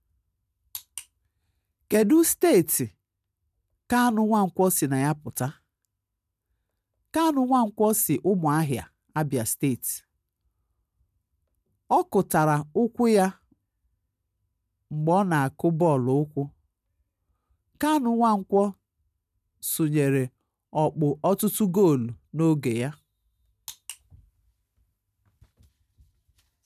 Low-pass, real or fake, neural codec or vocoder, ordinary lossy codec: 14.4 kHz; real; none; none